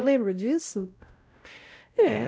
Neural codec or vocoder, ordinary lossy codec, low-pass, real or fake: codec, 16 kHz, 0.5 kbps, X-Codec, WavLM features, trained on Multilingual LibriSpeech; none; none; fake